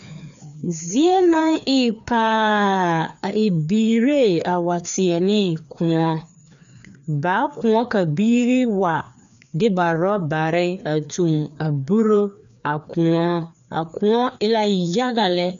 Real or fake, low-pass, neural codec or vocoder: fake; 7.2 kHz; codec, 16 kHz, 2 kbps, FreqCodec, larger model